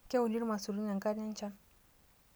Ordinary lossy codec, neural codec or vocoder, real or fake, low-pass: none; none; real; none